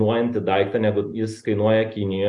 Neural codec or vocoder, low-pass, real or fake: none; 10.8 kHz; real